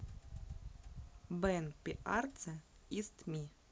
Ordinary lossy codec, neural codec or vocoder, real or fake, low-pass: none; none; real; none